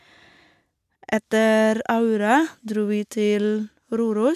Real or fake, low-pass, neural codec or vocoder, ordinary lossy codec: real; 14.4 kHz; none; MP3, 96 kbps